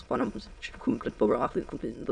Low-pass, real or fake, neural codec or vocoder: 9.9 kHz; fake; autoencoder, 22.05 kHz, a latent of 192 numbers a frame, VITS, trained on many speakers